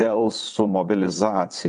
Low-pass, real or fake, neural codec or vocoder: 9.9 kHz; fake; vocoder, 22.05 kHz, 80 mel bands, WaveNeXt